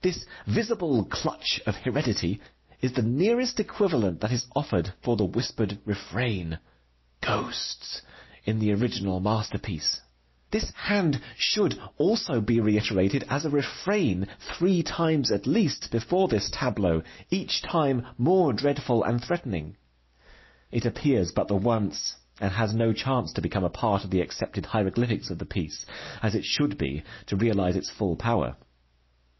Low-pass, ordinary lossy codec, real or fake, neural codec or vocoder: 7.2 kHz; MP3, 24 kbps; real; none